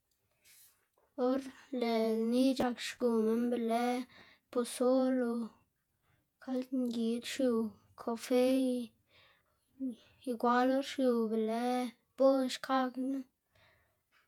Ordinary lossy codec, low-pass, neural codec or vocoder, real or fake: none; 19.8 kHz; vocoder, 48 kHz, 128 mel bands, Vocos; fake